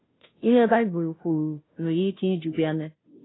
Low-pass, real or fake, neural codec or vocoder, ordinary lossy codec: 7.2 kHz; fake; codec, 16 kHz, 0.5 kbps, FunCodec, trained on Chinese and English, 25 frames a second; AAC, 16 kbps